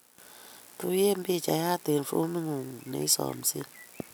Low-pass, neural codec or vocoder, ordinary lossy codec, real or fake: none; none; none; real